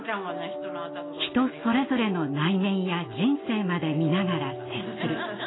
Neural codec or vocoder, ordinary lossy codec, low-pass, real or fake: none; AAC, 16 kbps; 7.2 kHz; real